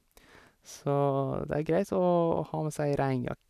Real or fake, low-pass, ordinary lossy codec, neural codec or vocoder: fake; 14.4 kHz; none; vocoder, 44.1 kHz, 128 mel bands every 256 samples, BigVGAN v2